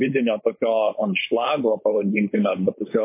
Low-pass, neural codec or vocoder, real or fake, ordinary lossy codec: 3.6 kHz; codec, 16 kHz, 4.8 kbps, FACodec; fake; MP3, 24 kbps